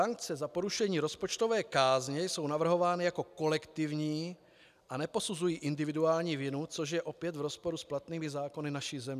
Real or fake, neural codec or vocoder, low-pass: real; none; 14.4 kHz